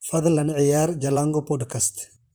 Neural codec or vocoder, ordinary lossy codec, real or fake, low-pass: vocoder, 44.1 kHz, 128 mel bands, Pupu-Vocoder; none; fake; none